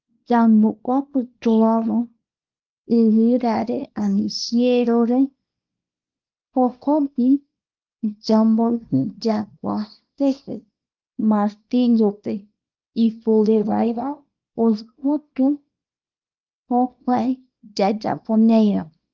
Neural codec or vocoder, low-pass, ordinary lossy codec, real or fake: codec, 24 kHz, 0.9 kbps, WavTokenizer, small release; 7.2 kHz; Opus, 24 kbps; fake